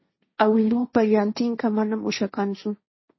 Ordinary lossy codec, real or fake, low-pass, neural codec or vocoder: MP3, 24 kbps; fake; 7.2 kHz; codec, 16 kHz, 1.1 kbps, Voila-Tokenizer